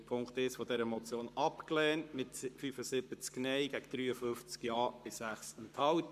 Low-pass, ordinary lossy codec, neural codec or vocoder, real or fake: 14.4 kHz; MP3, 96 kbps; codec, 44.1 kHz, 7.8 kbps, Pupu-Codec; fake